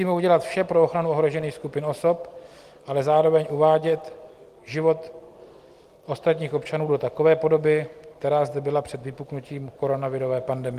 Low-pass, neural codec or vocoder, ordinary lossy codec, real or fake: 14.4 kHz; none; Opus, 24 kbps; real